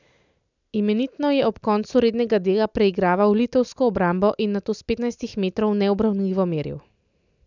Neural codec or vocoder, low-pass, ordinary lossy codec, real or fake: none; 7.2 kHz; none; real